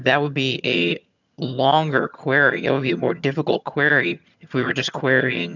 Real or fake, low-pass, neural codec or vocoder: fake; 7.2 kHz; vocoder, 22.05 kHz, 80 mel bands, HiFi-GAN